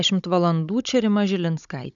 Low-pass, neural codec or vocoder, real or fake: 7.2 kHz; codec, 16 kHz, 16 kbps, FunCodec, trained on Chinese and English, 50 frames a second; fake